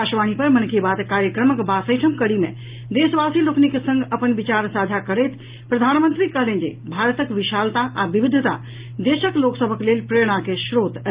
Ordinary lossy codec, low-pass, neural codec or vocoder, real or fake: Opus, 32 kbps; 3.6 kHz; none; real